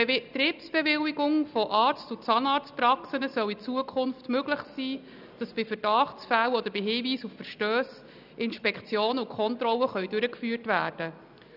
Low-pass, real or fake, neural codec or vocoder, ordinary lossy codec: 5.4 kHz; real; none; none